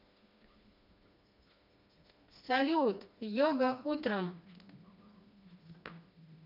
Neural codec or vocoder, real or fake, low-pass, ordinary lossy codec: codec, 16 kHz, 2 kbps, FreqCodec, smaller model; fake; 5.4 kHz; none